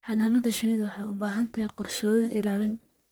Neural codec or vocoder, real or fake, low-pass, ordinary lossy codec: codec, 44.1 kHz, 1.7 kbps, Pupu-Codec; fake; none; none